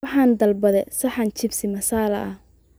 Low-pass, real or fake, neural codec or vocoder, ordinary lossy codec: none; real; none; none